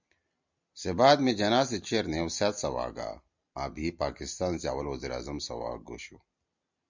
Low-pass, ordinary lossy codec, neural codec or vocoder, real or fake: 7.2 kHz; MP3, 64 kbps; none; real